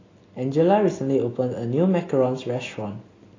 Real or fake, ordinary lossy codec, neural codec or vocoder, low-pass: real; AAC, 32 kbps; none; 7.2 kHz